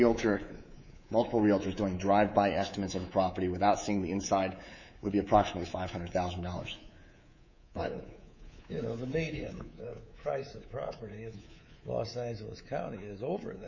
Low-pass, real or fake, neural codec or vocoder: 7.2 kHz; fake; codec, 24 kHz, 3.1 kbps, DualCodec